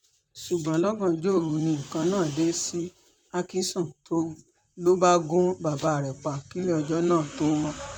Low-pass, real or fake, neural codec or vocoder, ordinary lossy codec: 19.8 kHz; fake; vocoder, 44.1 kHz, 128 mel bands, Pupu-Vocoder; none